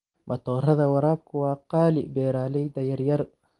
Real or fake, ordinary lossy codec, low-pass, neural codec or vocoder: real; Opus, 24 kbps; 10.8 kHz; none